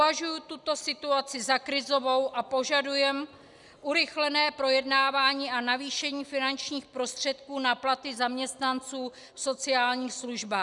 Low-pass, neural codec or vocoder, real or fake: 10.8 kHz; none; real